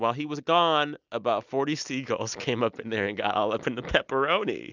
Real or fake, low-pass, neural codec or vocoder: real; 7.2 kHz; none